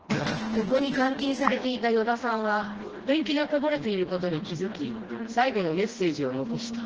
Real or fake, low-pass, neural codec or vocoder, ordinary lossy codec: fake; 7.2 kHz; codec, 16 kHz, 1 kbps, FreqCodec, smaller model; Opus, 16 kbps